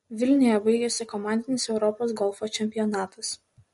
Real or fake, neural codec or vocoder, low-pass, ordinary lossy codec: real; none; 19.8 kHz; MP3, 48 kbps